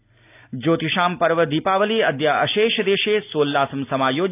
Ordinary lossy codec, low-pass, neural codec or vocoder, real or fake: AAC, 32 kbps; 3.6 kHz; none; real